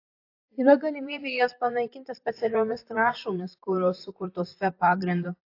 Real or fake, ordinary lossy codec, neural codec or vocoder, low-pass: fake; AAC, 32 kbps; vocoder, 44.1 kHz, 128 mel bands, Pupu-Vocoder; 5.4 kHz